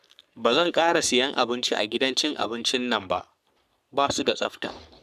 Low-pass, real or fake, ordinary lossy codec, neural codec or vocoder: 14.4 kHz; fake; none; codec, 44.1 kHz, 3.4 kbps, Pupu-Codec